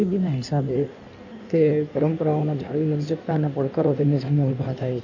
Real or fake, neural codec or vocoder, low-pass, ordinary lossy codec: fake; codec, 16 kHz in and 24 kHz out, 1.1 kbps, FireRedTTS-2 codec; 7.2 kHz; none